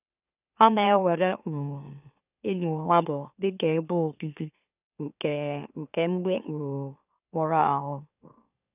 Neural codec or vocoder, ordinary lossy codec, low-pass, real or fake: autoencoder, 44.1 kHz, a latent of 192 numbers a frame, MeloTTS; none; 3.6 kHz; fake